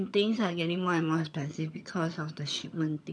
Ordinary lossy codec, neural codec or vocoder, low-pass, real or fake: none; vocoder, 22.05 kHz, 80 mel bands, HiFi-GAN; none; fake